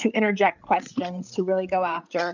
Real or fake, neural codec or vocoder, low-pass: fake; codec, 16 kHz, 8 kbps, FreqCodec, larger model; 7.2 kHz